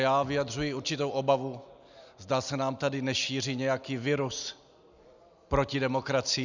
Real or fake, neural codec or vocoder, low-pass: real; none; 7.2 kHz